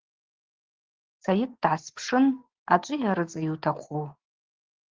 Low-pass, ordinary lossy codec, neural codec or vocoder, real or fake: 7.2 kHz; Opus, 16 kbps; none; real